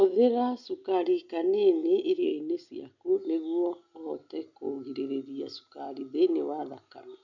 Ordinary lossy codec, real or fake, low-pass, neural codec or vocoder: none; real; 7.2 kHz; none